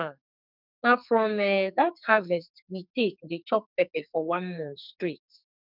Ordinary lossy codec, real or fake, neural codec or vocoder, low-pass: none; fake; codec, 44.1 kHz, 2.6 kbps, SNAC; 5.4 kHz